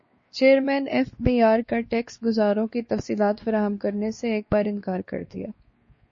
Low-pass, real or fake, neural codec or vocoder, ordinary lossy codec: 7.2 kHz; fake; codec, 16 kHz, 2 kbps, X-Codec, WavLM features, trained on Multilingual LibriSpeech; MP3, 32 kbps